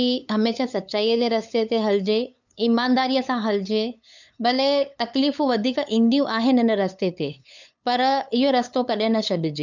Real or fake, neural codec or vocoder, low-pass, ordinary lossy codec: fake; codec, 16 kHz, 8 kbps, FunCodec, trained on LibriTTS, 25 frames a second; 7.2 kHz; none